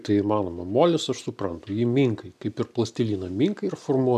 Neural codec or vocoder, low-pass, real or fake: none; 14.4 kHz; real